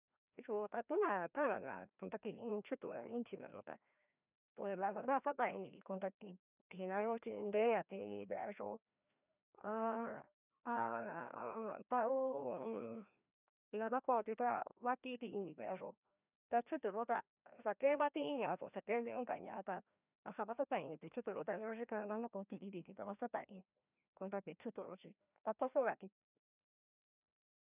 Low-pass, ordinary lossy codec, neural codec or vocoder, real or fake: 3.6 kHz; none; codec, 16 kHz, 1 kbps, FreqCodec, larger model; fake